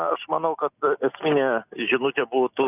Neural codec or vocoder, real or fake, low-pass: none; real; 3.6 kHz